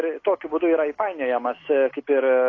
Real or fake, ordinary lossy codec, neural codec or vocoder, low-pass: real; AAC, 32 kbps; none; 7.2 kHz